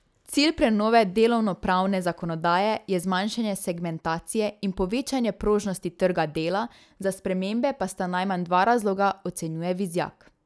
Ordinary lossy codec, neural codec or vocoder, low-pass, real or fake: none; none; none; real